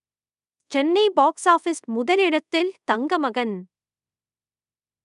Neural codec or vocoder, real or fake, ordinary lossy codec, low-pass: codec, 24 kHz, 0.5 kbps, DualCodec; fake; none; 10.8 kHz